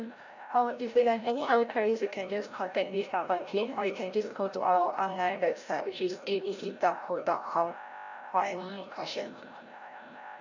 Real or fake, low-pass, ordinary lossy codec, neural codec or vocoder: fake; 7.2 kHz; none; codec, 16 kHz, 0.5 kbps, FreqCodec, larger model